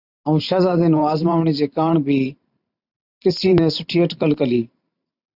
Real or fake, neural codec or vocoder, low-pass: fake; vocoder, 44.1 kHz, 128 mel bands every 512 samples, BigVGAN v2; 5.4 kHz